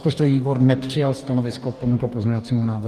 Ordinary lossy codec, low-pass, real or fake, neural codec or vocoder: Opus, 24 kbps; 14.4 kHz; fake; codec, 44.1 kHz, 2.6 kbps, DAC